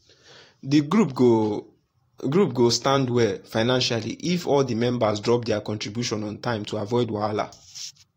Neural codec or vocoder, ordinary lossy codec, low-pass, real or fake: vocoder, 44.1 kHz, 128 mel bands every 512 samples, BigVGAN v2; AAC, 48 kbps; 19.8 kHz; fake